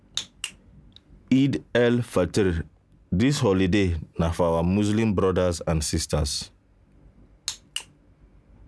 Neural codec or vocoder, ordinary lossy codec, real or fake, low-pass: none; none; real; none